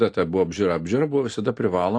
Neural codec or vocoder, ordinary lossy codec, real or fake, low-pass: none; AAC, 48 kbps; real; 9.9 kHz